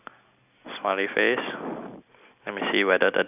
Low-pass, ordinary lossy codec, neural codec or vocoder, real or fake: 3.6 kHz; none; none; real